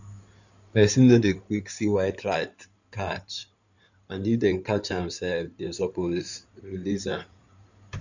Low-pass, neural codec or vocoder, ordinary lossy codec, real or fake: 7.2 kHz; codec, 16 kHz in and 24 kHz out, 2.2 kbps, FireRedTTS-2 codec; none; fake